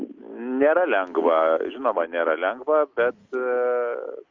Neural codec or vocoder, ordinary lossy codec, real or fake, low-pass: none; Opus, 24 kbps; real; 7.2 kHz